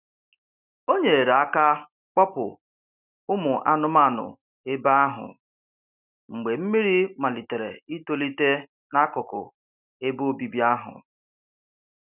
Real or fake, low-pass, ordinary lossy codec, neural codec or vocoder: real; 3.6 kHz; none; none